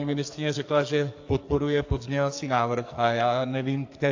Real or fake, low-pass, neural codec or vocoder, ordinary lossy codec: fake; 7.2 kHz; codec, 44.1 kHz, 2.6 kbps, SNAC; AAC, 48 kbps